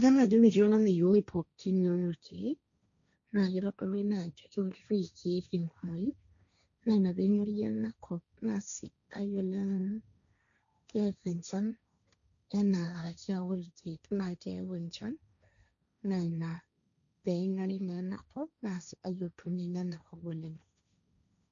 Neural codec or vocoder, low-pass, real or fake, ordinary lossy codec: codec, 16 kHz, 1.1 kbps, Voila-Tokenizer; 7.2 kHz; fake; none